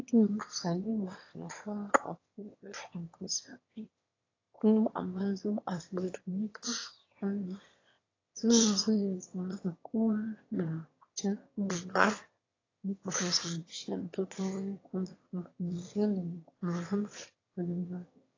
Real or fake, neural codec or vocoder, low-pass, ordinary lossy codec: fake; autoencoder, 22.05 kHz, a latent of 192 numbers a frame, VITS, trained on one speaker; 7.2 kHz; AAC, 32 kbps